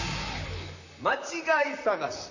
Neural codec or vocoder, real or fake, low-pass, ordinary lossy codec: vocoder, 44.1 kHz, 128 mel bands, Pupu-Vocoder; fake; 7.2 kHz; none